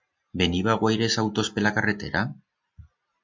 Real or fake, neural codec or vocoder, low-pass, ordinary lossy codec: real; none; 7.2 kHz; MP3, 64 kbps